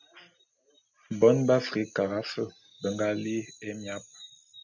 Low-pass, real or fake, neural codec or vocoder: 7.2 kHz; real; none